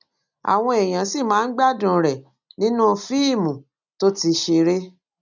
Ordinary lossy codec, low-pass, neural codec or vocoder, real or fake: none; 7.2 kHz; none; real